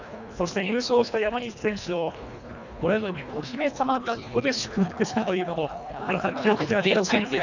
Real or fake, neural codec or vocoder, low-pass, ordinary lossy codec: fake; codec, 24 kHz, 1.5 kbps, HILCodec; 7.2 kHz; none